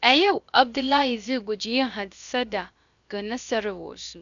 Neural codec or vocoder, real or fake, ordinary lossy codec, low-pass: codec, 16 kHz, about 1 kbps, DyCAST, with the encoder's durations; fake; none; 7.2 kHz